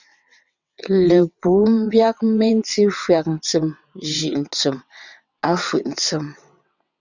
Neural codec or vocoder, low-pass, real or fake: vocoder, 22.05 kHz, 80 mel bands, WaveNeXt; 7.2 kHz; fake